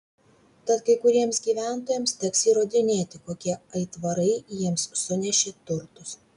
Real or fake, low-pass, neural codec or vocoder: real; 10.8 kHz; none